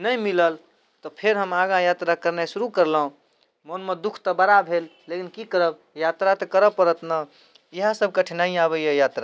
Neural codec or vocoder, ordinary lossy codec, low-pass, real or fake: none; none; none; real